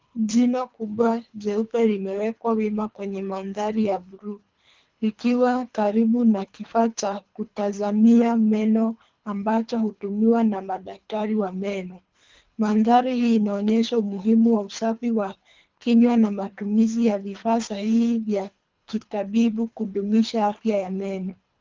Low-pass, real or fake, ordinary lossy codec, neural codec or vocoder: 7.2 kHz; fake; Opus, 24 kbps; codec, 24 kHz, 3 kbps, HILCodec